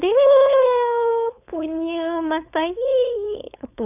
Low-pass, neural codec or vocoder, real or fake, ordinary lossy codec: 3.6 kHz; codec, 16 kHz, 4.8 kbps, FACodec; fake; none